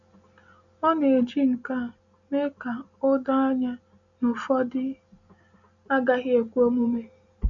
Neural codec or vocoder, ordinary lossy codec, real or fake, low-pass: none; none; real; 7.2 kHz